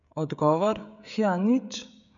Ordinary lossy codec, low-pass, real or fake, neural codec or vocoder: none; 7.2 kHz; fake; codec, 16 kHz, 16 kbps, FreqCodec, smaller model